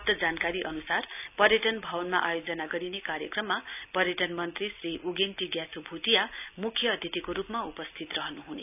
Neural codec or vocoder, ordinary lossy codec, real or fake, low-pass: none; none; real; 3.6 kHz